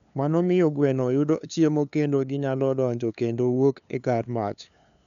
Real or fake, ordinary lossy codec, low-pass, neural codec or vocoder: fake; none; 7.2 kHz; codec, 16 kHz, 2 kbps, FunCodec, trained on LibriTTS, 25 frames a second